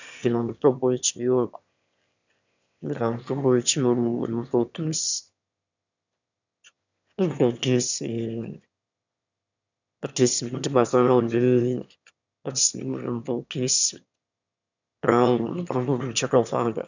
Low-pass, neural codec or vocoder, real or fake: 7.2 kHz; autoencoder, 22.05 kHz, a latent of 192 numbers a frame, VITS, trained on one speaker; fake